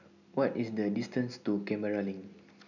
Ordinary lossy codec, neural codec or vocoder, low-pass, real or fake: none; none; 7.2 kHz; real